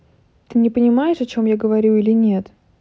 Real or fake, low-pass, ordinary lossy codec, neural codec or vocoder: real; none; none; none